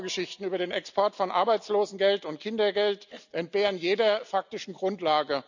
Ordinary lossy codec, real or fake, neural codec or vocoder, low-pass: none; real; none; 7.2 kHz